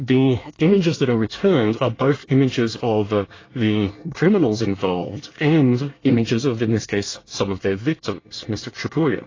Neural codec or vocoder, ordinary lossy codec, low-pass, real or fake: codec, 24 kHz, 1 kbps, SNAC; AAC, 32 kbps; 7.2 kHz; fake